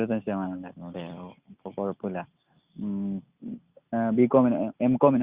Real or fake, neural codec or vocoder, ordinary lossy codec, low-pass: real; none; Opus, 64 kbps; 3.6 kHz